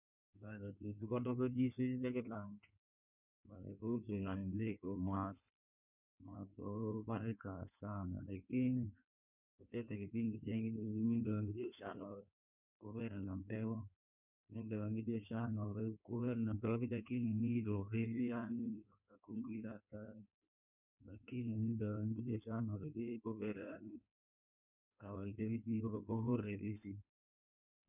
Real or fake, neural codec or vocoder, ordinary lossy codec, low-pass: fake; codec, 16 kHz in and 24 kHz out, 1.1 kbps, FireRedTTS-2 codec; none; 3.6 kHz